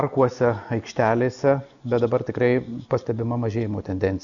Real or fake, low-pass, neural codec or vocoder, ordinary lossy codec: real; 7.2 kHz; none; MP3, 96 kbps